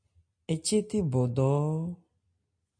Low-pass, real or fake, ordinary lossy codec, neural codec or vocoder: 9.9 kHz; real; MP3, 48 kbps; none